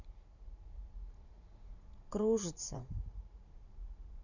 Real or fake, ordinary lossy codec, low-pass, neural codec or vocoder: fake; none; 7.2 kHz; vocoder, 44.1 kHz, 80 mel bands, Vocos